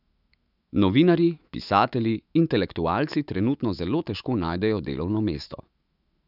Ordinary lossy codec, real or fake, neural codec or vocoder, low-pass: none; fake; autoencoder, 48 kHz, 128 numbers a frame, DAC-VAE, trained on Japanese speech; 5.4 kHz